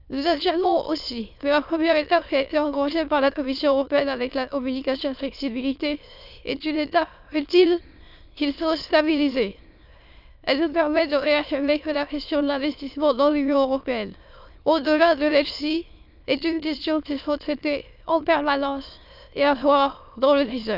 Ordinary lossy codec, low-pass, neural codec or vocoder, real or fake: none; 5.4 kHz; autoencoder, 22.05 kHz, a latent of 192 numbers a frame, VITS, trained on many speakers; fake